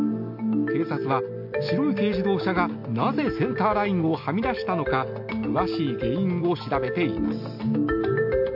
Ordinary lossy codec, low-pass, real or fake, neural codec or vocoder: AAC, 48 kbps; 5.4 kHz; real; none